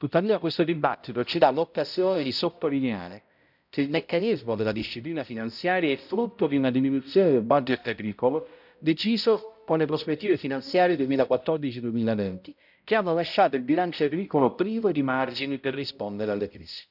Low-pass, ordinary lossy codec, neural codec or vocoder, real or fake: 5.4 kHz; none; codec, 16 kHz, 0.5 kbps, X-Codec, HuBERT features, trained on balanced general audio; fake